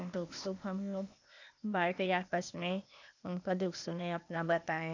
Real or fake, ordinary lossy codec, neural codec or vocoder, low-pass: fake; none; codec, 16 kHz, 0.8 kbps, ZipCodec; 7.2 kHz